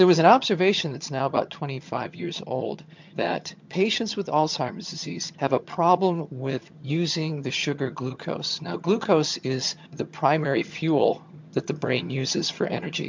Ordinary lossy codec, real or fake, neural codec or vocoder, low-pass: MP3, 64 kbps; fake; vocoder, 22.05 kHz, 80 mel bands, HiFi-GAN; 7.2 kHz